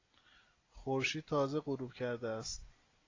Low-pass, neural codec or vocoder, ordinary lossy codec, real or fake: 7.2 kHz; none; AAC, 32 kbps; real